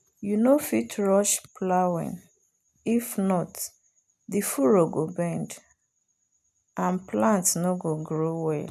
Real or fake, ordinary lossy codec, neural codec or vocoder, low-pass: real; none; none; 14.4 kHz